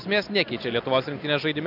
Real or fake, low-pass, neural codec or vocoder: real; 5.4 kHz; none